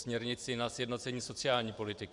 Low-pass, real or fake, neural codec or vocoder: 10.8 kHz; fake; vocoder, 24 kHz, 100 mel bands, Vocos